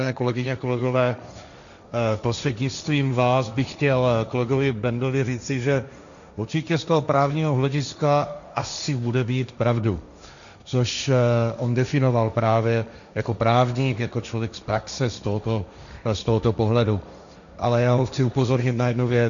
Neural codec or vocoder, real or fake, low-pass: codec, 16 kHz, 1.1 kbps, Voila-Tokenizer; fake; 7.2 kHz